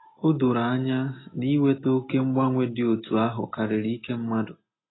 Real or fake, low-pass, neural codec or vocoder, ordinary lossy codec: real; 7.2 kHz; none; AAC, 16 kbps